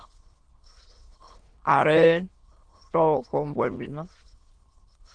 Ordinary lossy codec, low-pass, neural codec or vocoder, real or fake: Opus, 16 kbps; 9.9 kHz; autoencoder, 22.05 kHz, a latent of 192 numbers a frame, VITS, trained on many speakers; fake